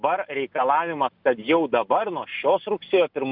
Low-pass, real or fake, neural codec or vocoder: 5.4 kHz; real; none